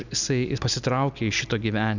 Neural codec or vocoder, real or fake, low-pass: none; real; 7.2 kHz